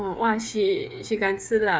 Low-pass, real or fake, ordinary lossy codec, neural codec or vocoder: none; fake; none; codec, 16 kHz, 8 kbps, FreqCodec, smaller model